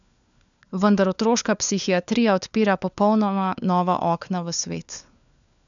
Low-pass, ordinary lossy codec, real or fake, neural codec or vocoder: 7.2 kHz; none; fake; codec, 16 kHz, 4 kbps, FunCodec, trained on LibriTTS, 50 frames a second